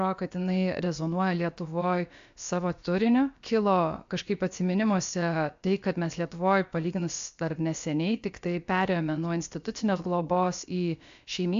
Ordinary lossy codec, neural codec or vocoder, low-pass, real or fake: AAC, 64 kbps; codec, 16 kHz, about 1 kbps, DyCAST, with the encoder's durations; 7.2 kHz; fake